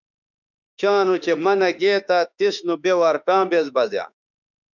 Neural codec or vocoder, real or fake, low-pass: autoencoder, 48 kHz, 32 numbers a frame, DAC-VAE, trained on Japanese speech; fake; 7.2 kHz